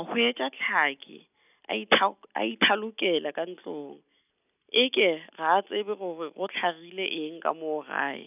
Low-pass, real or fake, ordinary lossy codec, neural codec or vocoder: 3.6 kHz; real; none; none